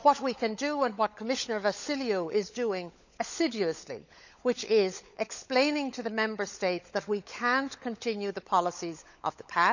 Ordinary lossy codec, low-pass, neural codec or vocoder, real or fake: none; 7.2 kHz; codec, 16 kHz, 16 kbps, FunCodec, trained on LibriTTS, 50 frames a second; fake